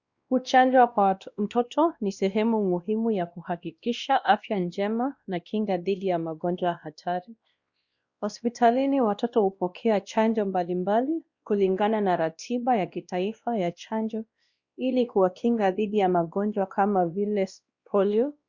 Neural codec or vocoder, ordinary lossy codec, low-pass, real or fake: codec, 16 kHz, 1 kbps, X-Codec, WavLM features, trained on Multilingual LibriSpeech; Opus, 64 kbps; 7.2 kHz; fake